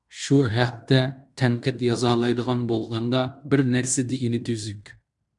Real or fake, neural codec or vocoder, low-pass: fake; codec, 16 kHz in and 24 kHz out, 0.9 kbps, LongCat-Audio-Codec, fine tuned four codebook decoder; 10.8 kHz